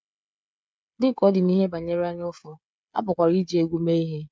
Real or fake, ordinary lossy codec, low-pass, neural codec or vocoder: fake; none; none; codec, 16 kHz, 16 kbps, FreqCodec, smaller model